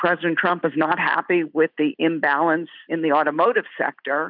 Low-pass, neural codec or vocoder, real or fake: 5.4 kHz; none; real